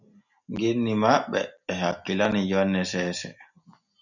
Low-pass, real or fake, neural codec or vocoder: 7.2 kHz; real; none